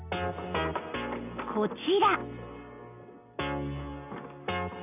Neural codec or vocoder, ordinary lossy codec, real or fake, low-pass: none; none; real; 3.6 kHz